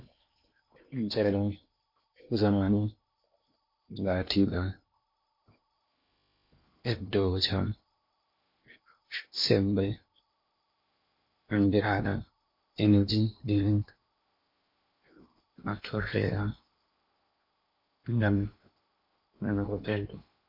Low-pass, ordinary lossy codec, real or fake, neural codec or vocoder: 5.4 kHz; MP3, 32 kbps; fake; codec, 16 kHz in and 24 kHz out, 0.8 kbps, FocalCodec, streaming, 65536 codes